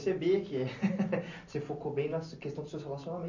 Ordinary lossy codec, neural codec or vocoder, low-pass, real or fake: none; none; 7.2 kHz; real